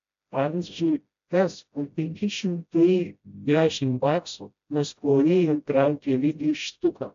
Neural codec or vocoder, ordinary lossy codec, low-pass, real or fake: codec, 16 kHz, 0.5 kbps, FreqCodec, smaller model; AAC, 64 kbps; 7.2 kHz; fake